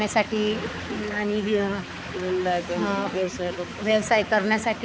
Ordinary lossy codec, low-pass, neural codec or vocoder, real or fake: none; none; codec, 16 kHz, 4 kbps, X-Codec, HuBERT features, trained on balanced general audio; fake